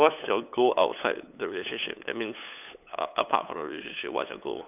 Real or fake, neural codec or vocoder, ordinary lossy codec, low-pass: fake; codec, 16 kHz, 8 kbps, FunCodec, trained on LibriTTS, 25 frames a second; none; 3.6 kHz